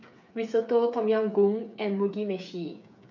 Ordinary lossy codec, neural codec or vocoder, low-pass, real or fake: none; codec, 16 kHz, 8 kbps, FreqCodec, smaller model; 7.2 kHz; fake